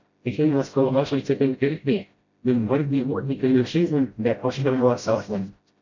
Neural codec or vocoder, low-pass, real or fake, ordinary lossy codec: codec, 16 kHz, 0.5 kbps, FreqCodec, smaller model; 7.2 kHz; fake; MP3, 48 kbps